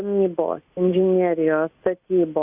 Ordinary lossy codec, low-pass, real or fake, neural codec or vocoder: Opus, 64 kbps; 3.6 kHz; real; none